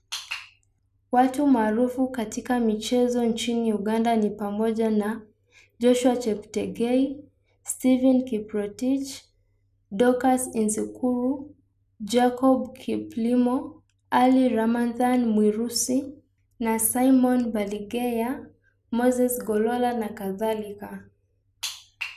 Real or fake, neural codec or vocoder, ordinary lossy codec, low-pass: real; none; none; 14.4 kHz